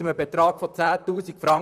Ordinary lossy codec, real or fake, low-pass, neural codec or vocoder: none; fake; 14.4 kHz; vocoder, 44.1 kHz, 128 mel bands, Pupu-Vocoder